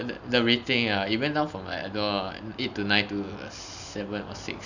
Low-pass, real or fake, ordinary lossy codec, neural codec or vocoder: 7.2 kHz; real; none; none